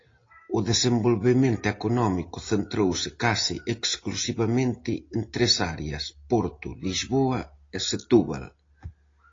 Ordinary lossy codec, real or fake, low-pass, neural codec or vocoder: AAC, 32 kbps; real; 7.2 kHz; none